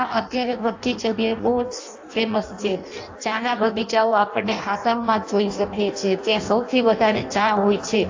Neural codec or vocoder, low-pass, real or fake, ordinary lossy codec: codec, 16 kHz in and 24 kHz out, 0.6 kbps, FireRedTTS-2 codec; 7.2 kHz; fake; AAC, 48 kbps